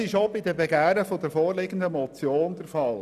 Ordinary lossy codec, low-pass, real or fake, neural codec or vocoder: none; 14.4 kHz; real; none